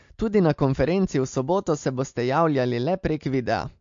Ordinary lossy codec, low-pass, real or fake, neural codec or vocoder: MP3, 48 kbps; 7.2 kHz; real; none